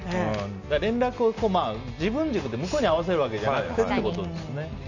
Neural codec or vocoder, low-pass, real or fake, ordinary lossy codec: none; 7.2 kHz; real; none